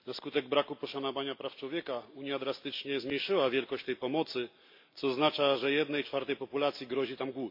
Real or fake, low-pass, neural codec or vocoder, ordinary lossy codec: real; 5.4 kHz; none; MP3, 32 kbps